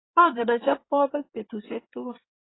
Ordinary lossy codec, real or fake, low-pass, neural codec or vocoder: AAC, 16 kbps; fake; 7.2 kHz; codec, 16 kHz in and 24 kHz out, 2.2 kbps, FireRedTTS-2 codec